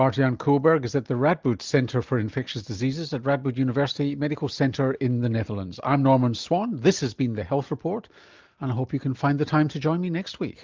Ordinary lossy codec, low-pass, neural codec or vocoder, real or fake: Opus, 24 kbps; 7.2 kHz; none; real